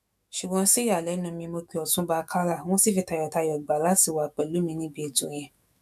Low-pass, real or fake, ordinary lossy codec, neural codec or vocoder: 14.4 kHz; fake; none; autoencoder, 48 kHz, 128 numbers a frame, DAC-VAE, trained on Japanese speech